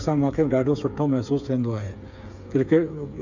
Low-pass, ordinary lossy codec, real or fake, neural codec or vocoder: 7.2 kHz; none; fake; codec, 16 kHz, 8 kbps, FreqCodec, smaller model